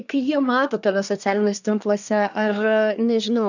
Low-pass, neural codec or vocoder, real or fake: 7.2 kHz; codec, 24 kHz, 1 kbps, SNAC; fake